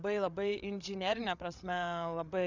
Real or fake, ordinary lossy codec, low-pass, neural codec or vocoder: fake; Opus, 64 kbps; 7.2 kHz; codec, 16 kHz, 8 kbps, FreqCodec, larger model